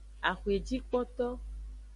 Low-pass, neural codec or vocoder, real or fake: 10.8 kHz; none; real